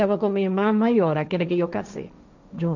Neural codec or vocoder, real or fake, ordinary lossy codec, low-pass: codec, 16 kHz, 1.1 kbps, Voila-Tokenizer; fake; none; 7.2 kHz